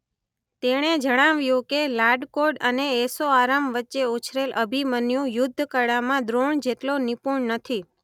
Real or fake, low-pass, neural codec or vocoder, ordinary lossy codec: real; 19.8 kHz; none; none